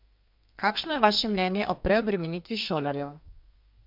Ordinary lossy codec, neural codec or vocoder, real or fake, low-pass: MP3, 48 kbps; codec, 32 kHz, 1.9 kbps, SNAC; fake; 5.4 kHz